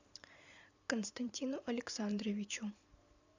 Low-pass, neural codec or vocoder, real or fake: 7.2 kHz; vocoder, 44.1 kHz, 128 mel bands every 512 samples, BigVGAN v2; fake